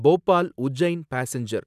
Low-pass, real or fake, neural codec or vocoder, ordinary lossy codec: 14.4 kHz; real; none; none